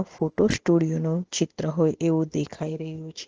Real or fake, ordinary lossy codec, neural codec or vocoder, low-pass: real; Opus, 16 kbps; none; 7.2 kHz